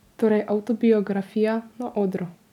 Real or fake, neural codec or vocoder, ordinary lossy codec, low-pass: real; none; none; 19.8 kHz